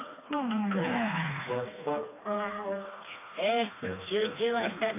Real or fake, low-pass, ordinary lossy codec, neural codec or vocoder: fake; 3.6 kHz; AAC, 24 kbps; codec, 16 kHz, 2 kbps, FreqCodec, smaller model